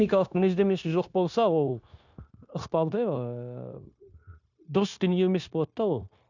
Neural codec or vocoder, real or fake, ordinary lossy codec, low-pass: codec, 16 kHz, 0.9 kbps, LongCat-Audio-Codec; fake; none; 7.2 kHz